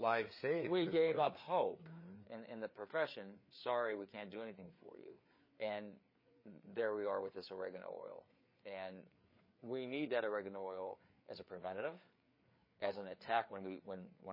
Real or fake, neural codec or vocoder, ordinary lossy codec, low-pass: fake; codec, 16 kHz, 4 kbps, FreqCodec, larger model; MP3, 24 kbps; 5.4 kHz